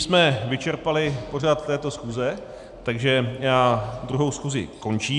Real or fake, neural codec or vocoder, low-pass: real; none; 10.8 kHz